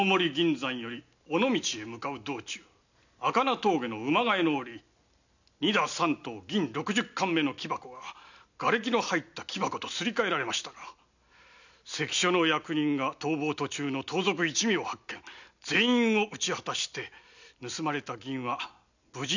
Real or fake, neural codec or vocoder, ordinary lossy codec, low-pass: real; none; none; 7.2 kHz